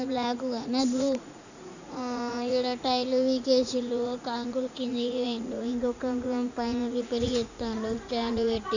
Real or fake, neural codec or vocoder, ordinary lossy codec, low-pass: fake; vocoder, 44.1 kHz, 80 mel bands, Vocos; none; 7.2 kHz